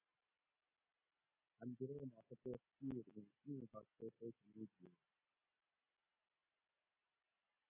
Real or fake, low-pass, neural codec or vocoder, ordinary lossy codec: real; 3.6 kHz; none; AAC, 24 kbps